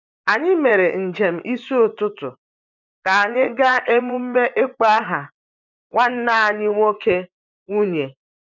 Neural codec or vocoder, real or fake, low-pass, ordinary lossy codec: vocoder, 24 kHz, 100 mel bands, Vocos; fake; 7.2 kHz; none